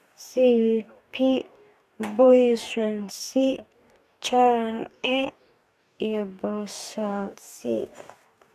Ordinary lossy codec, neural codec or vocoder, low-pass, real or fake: none; codec, 44.1 kHz, 2.6 kbps, DAC; 14.4 kHz; fake